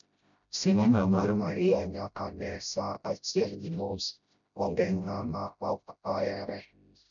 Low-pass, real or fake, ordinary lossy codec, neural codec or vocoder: 7.2 kHz; fake; none; codec, 16 kHz, 0.5 kbps, FreqCodec, smaller model